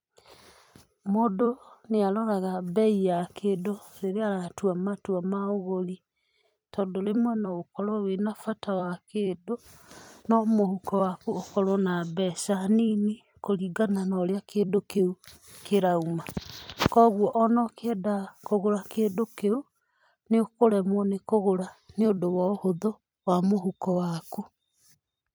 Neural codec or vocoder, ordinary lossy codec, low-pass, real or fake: vocoder, 44.1 kHz, 128 mel bands every 256 samples, BigVGAN v2; none; none; fake